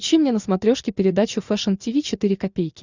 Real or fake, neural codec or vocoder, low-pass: real; none; 7.2 kHz